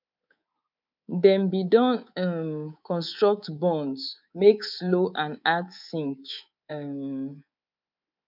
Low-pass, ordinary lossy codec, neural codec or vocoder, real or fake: 5.4 kHz; none; codec, 24 kHz, 3.1 kbps, DualCodec; fake